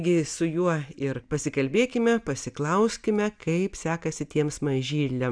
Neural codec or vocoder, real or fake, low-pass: none; real; 9.9 kHz